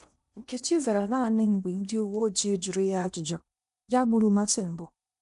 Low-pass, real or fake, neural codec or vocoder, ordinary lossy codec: 10.8 kHz; fake; codec, 16 kHz in and 24 kHz out, 0.8 kbps, FocalCodec, streaming, 65536 codes; none